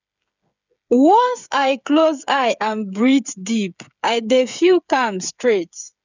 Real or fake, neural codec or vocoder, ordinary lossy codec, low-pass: fake; codec, 16 kHz, 8 kbps, FreqCodec, smaller model; none; 7.2 kHz